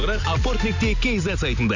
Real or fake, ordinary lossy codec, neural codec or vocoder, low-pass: real; none; none; 7.2 kHz